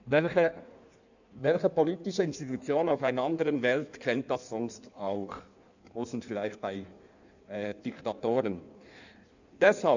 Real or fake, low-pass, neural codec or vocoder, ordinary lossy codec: fake; 7.2 kHz; codec, 16 kHz in and 24 kHz out, 1.1 kbps, FireRedTTS-2 codec; none